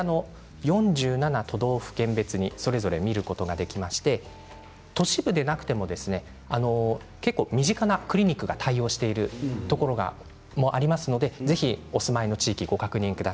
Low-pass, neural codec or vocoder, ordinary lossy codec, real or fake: none; none; none; real